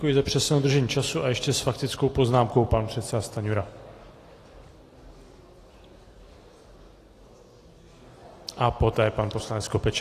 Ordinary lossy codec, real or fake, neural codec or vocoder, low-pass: AAC, 48 kbps; real; none; 14.4 kHz